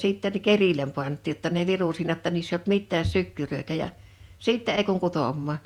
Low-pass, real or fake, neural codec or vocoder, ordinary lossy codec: 19.8 kHz; fake; vocoder, 48 kHz, 128 mel bands, Vocos; Opus, 64 kbps